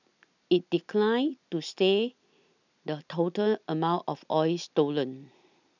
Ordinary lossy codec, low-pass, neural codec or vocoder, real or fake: none; 7.2 kHz; none; real